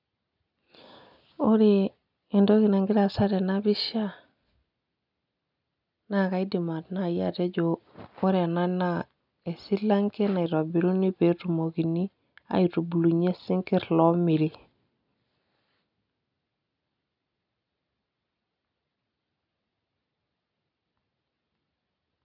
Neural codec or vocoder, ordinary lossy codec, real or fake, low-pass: none; none; real; 5.4 kHz